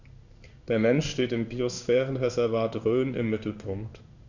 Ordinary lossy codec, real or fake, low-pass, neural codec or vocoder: none; fake; 7.2 kHz; codec, 16 kHz in and 24 kHz out, 1 kbps, XY-Tokenizer